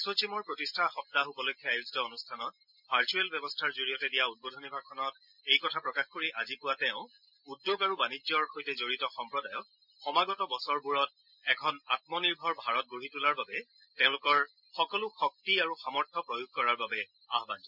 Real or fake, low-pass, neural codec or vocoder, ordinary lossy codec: real; 5.4 kHz; none; none